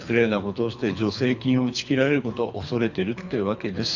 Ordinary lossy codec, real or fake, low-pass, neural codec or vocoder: none; fake; 7.2 kHz; codec, 24 kHz, 3 kbps, HILCodec